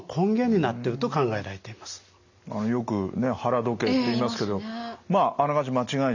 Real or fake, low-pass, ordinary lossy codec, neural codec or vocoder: real; 7.2 kHz; none; none